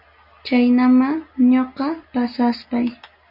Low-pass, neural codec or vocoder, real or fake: 5.4 kHz; none; real